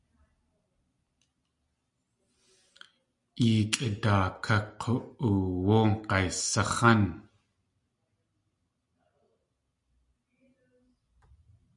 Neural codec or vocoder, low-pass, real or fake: none; 10.8 kHz; real